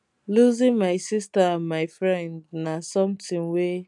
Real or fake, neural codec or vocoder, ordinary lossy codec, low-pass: real; none; none; 10.8 kHz